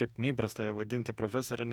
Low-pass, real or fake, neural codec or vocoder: 19.8 kHz; fake; codec, 44.1 kHz, 2.6 kbps, DAC